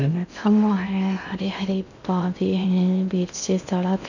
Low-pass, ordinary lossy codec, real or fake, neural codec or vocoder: 7.2 kHz; none; fake; codec, 16 kHz in and 24 kHz out, 0.6 kbps, FocalCodec, streaming, 2048 codes